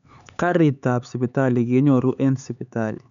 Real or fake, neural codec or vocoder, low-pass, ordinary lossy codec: fake; codec, 16 kHz, 4 kbps, X-Codec, HuBERT features, trained on LibriSpeech; 7.2 kHz; none